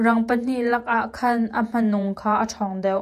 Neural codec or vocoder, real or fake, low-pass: vocoder, 48 kHz, 128 mel bands, Vocos; fake; 14.4 kHz